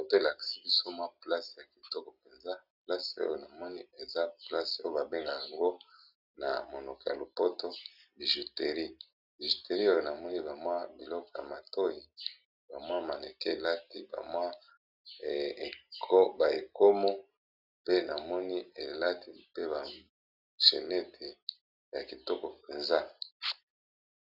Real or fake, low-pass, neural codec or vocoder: real; 5.4 kHz; none